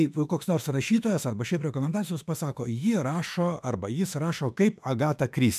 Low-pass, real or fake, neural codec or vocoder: 14.4 kHz; fake; autoencoder, 48 kHz, 32 numbers a frame, DAC-VAE, trained on Japanese speech